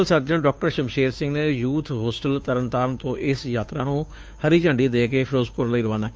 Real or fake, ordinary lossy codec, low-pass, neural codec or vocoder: fake; none; none; codec, 16 kHz, 2 kbps, FunCodec, trained on Chinese and English, 25 frames a second